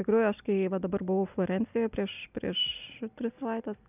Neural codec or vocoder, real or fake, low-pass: none; real; 3.6 kHz